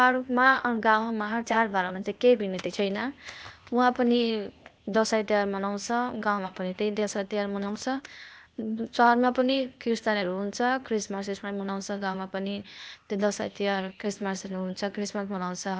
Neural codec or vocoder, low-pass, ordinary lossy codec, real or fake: codec, 16 kHz, 0.8 kbps, ZipCodec; none; none; fake